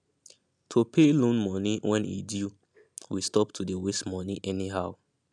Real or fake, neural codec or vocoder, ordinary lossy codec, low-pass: real; none; none; none